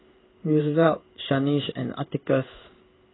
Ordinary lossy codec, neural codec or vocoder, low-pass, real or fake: AAC, 16 kbps; codec, 16 kHz in and 24 kHz out, 1 kbps, XY-Tokenizer; 7.2 kHz; fake